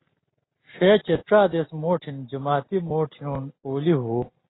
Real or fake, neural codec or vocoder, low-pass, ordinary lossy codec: real; none; 7.2 kHz; AAC, 16 kbps